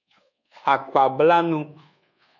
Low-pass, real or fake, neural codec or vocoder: 7.2 kHz; fake; codec, 24 kHz, 1.2 kbps, DualCodec